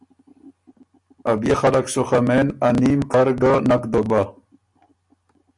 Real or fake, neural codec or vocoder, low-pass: real; none; 10.8 kHz